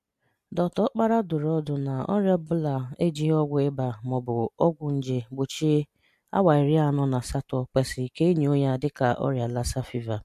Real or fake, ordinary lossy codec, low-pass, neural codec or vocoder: real; MP3, 64 kbps; 14.4 kHz; none